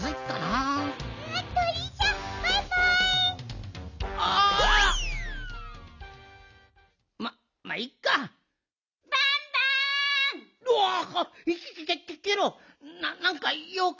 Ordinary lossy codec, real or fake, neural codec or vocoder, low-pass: none; real; none; 7.2 kHz